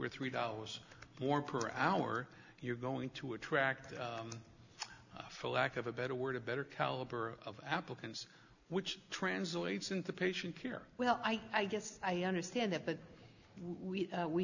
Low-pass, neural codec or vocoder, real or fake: 7.2 kHz; none; real